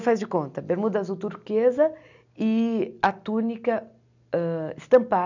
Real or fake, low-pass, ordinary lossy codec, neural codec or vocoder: real; 7.2 kHz; none; none